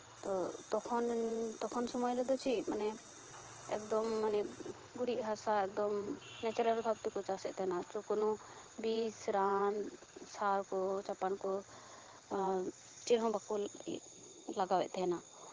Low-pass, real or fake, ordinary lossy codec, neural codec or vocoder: 7.2 kHz; fake; Opus, 16 kbps; vocoder, 22.05 kHz, 80 mel bands, WaveNeXt